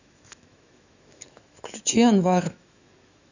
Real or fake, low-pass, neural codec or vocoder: fake; 7.2 kHz; autoencoder, 48 kHz, 128 numbers a frame, DAC-VAE, trained on Japanese speech